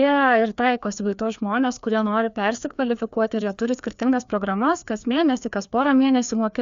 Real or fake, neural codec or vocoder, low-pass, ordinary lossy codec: fake; codec, 16 kHz, 2 kbps, FreqCodec, larger model; 7.2 kHz; Opus, 64 kbps